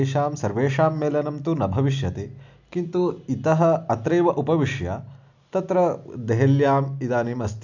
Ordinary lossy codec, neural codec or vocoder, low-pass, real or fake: none; none; 7.2 kHz; real